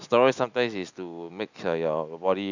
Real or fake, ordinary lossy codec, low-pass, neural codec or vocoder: real; none; 7.2 kHz; none